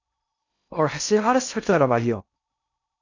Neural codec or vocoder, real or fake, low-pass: codec, 16 kHz in and 24 kHz out, 0.6 kbps, FocalCodec, streaming, 2048 codes; fake; 7.2 kHz